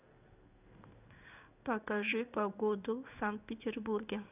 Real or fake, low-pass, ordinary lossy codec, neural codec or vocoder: fake; 3.6 kHz; none; codec, 16 kHz in and 24 kHz out, 1 kbps, XY-Tokenizer